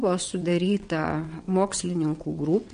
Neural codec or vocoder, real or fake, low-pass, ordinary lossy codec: vocoder, 22.05 kHz, 80 mel bands, WaveNeXt; fake; 9.9 kHz; MP3, 48 kbps